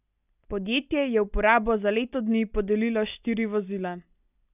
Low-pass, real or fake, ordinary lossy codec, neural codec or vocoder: 3.6 kHz; real; none; none